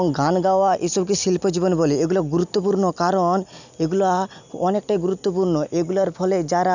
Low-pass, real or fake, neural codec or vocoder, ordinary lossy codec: 7.2 kHz; real; none; none